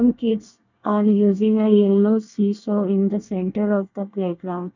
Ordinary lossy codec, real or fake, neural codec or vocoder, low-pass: none; fake; codec, 24 kHz, 1 kbps, SNAC; 7.2 kHz